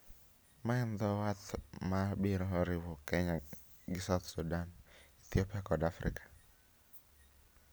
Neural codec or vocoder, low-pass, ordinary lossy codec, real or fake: none; none; none; real